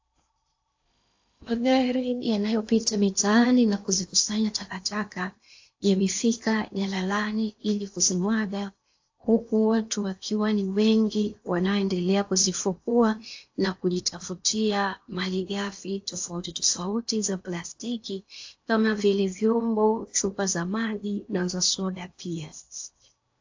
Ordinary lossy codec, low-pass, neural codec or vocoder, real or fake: AAC, 48 kbps; 7.2 kHz; codec, 16 kHz in and 24 kHz out, 0.8 kbps, FocalCodec, streaming, 65536 codes; fake